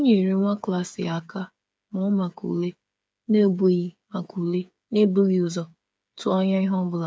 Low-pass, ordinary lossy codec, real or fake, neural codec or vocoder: none; none; fake; codec, 16 kHz, 8 kbps, FreqCodec, smaller model